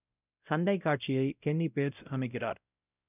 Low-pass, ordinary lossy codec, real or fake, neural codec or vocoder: 3.6 kHz; none; fake; codec, 16 kHz, 0.5 kbps, X-Codec, WavLM features, trained on Multilingual LibriSpeech